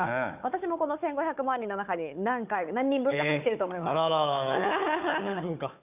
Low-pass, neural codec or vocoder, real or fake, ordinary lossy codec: 3.6 kHz; codec, 16 kHz, 4 kbps, FunCodec, trained on Chinese and English, 50 frames a second; fake; none